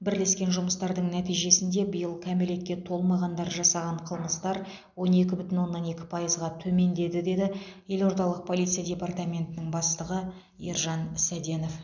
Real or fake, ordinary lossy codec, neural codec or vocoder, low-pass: real; none; none; 7.2 kHz